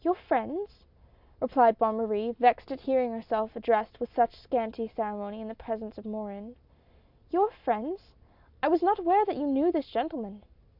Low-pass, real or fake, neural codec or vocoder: 5.4 kHz; real; none